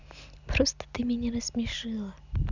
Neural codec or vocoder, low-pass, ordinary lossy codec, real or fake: none; 7.2 kHz; none; real